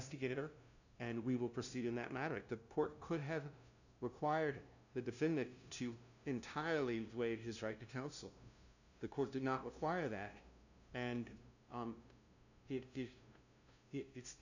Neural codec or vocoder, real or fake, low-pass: codec, 16 kHz, 0.5 kbps, FunCodec, trained on LibriTTS, 25 frames a second; fake; 7.2 kHz